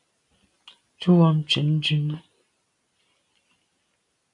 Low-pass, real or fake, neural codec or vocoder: 10.8 kHz; real; none